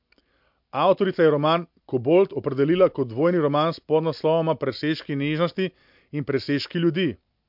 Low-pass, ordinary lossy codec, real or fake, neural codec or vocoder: 5.4 kHz; MP3, 48 kbps; real; none